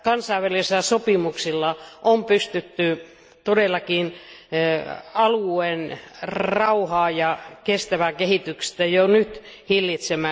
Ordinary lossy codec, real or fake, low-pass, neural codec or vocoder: none; real; none; none